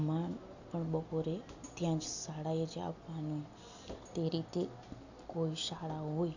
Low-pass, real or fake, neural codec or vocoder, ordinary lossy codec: 7.2 kHz; real; none; none